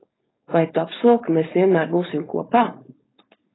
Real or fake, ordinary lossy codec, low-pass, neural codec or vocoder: fake; AAC, 16 kbps; 7.2 kHz; codec, 16 kHz, 4.8 kbps, FACodec